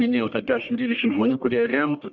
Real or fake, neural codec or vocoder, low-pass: fake; codec, 44.1 kHz, 1.7 kbps, Pupu-Codec; 7.2 kHz